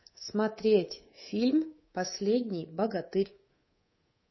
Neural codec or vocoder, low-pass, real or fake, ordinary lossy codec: codec, 24 kHz, 3.1 kbps, DualCodec; 7.2 kHz; fake; MP3, 24 kbps